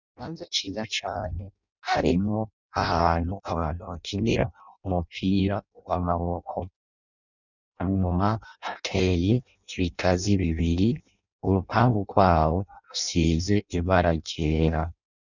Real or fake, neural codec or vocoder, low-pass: fake; codec, 16 kHz in and 24 kHz out, 0.6 kbps, FireRedTTS-2 codec; 7.2 kHz